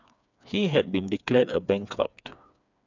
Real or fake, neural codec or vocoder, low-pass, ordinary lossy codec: fake; codec, 16 kHz, 4 kbps, FreqCodec, smaller model; 7.2 kHz; none